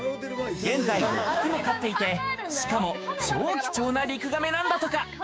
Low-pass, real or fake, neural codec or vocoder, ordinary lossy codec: none; fake; codec, 16 kHz, 6 kbps, DAC; none